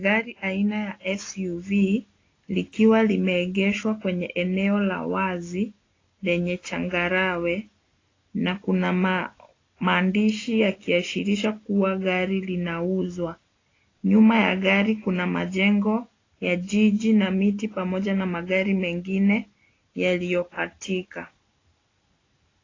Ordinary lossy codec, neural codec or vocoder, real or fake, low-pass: AAC, 32 kbps; none; real; 7.2 kHz